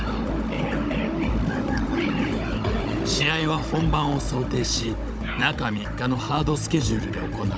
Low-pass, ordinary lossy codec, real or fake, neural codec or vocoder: none; none; fake; codec, 16 kHz, 16 kbps, FunCodec, trained on Chinese and English, 50 frames a second